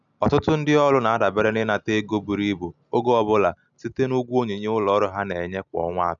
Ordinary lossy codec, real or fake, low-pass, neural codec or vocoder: none; real; 7.2 kHz; none